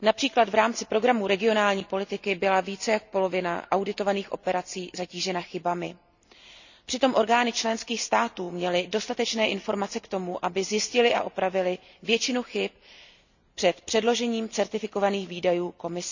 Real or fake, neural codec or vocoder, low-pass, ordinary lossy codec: real; none; 7.2 kHz; none